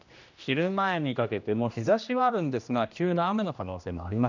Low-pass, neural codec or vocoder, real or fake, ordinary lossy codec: 7.2 kHz; codec, 16 kHz, 1 kbps, X-Codec, HuBERT features, trained on general audio; fake; none